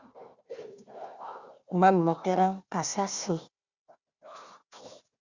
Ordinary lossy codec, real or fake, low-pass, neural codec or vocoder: Opus, 64 kbps; fake; 7.2 kHz; codec, 16 kHz, 1 kbps, FunCodec, trained on Chinese and English, 50 frames a second